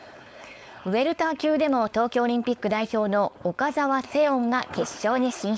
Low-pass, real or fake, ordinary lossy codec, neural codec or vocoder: none; fake; none; codec, 16 kHz, 4.8 kbps, FACodec